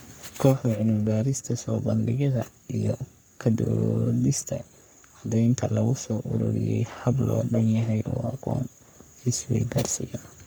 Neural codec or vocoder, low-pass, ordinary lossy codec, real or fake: codec, 44.1 kHz, 3.4 kbps, Pupu-Codec; none; none; fake